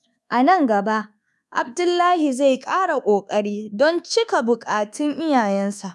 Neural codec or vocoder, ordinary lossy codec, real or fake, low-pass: codec, 24 kHz, 1.2 kbps, DualCodec; none; fake; none